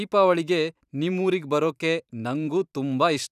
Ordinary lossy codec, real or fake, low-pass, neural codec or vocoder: none; fake; 14.4 kHz; vocoder, 44.1 kHz, 128 mel bands every 512 samples, BigVGAN v2